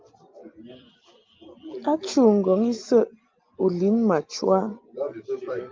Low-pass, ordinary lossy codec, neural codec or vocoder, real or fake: 7.2 kHz; Opus, 32 kbps; none; real